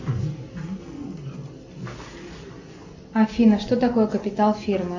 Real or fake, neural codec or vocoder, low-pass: real; none; 7.2 kHz